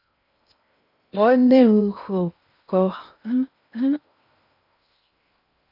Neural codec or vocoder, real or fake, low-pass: codec, 16 kHz in and 24 kHz out, 0.6 kbps, FocalCodec, streaming, 4096 codes; fake; 5.4 kHz